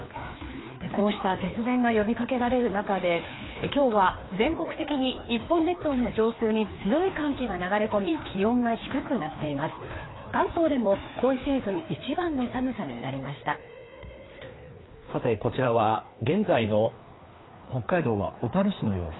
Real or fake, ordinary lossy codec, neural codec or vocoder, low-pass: fake; AAC, 16 kbps; codec, 16 kHz, 2 kbps, FreqCodec, larger model; 7.2 kHz